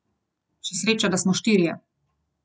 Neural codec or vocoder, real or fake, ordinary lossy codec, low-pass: none; real; none; none